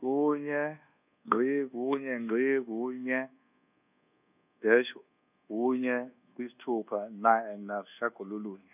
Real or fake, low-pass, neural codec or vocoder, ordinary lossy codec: fake; 3.6 kHz; codec, 24 kHz, 1.2 kbps, DualCodec; none